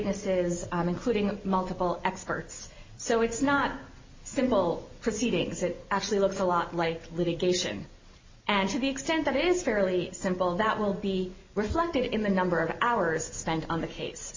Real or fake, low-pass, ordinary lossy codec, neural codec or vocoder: real; 7.2 kHz; MP3, 64 kbps; none